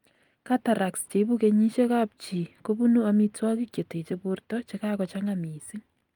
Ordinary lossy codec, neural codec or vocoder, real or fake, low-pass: Opus, 32 kbps; none; real; 19.8 kHz